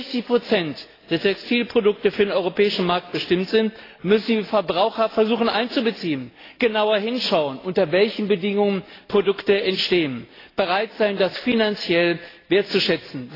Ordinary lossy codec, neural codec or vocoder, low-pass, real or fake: AAC, 24 kbps; none; 5.4 kHz; real